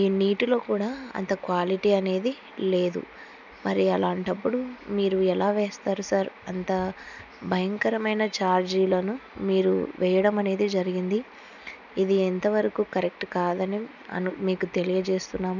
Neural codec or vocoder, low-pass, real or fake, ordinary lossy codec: none; 7.2 kHz; real; none